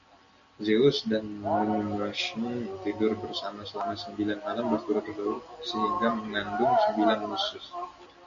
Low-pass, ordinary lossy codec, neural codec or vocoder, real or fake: 7.2 kHz; MP3, 96 kbps; none; real